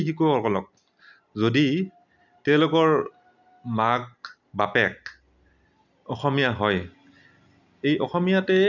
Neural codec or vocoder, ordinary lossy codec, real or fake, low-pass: none; none; real; 7.2 kHz